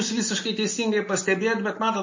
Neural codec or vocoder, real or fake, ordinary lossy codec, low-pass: none; real; MP3, 32 kbps; 7.2 kHz